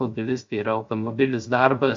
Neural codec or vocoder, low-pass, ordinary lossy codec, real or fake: codec, 16 kHz, 0.3 kbps, FocalCodec; 7.2 kHz; MP3, 48 kbps; fake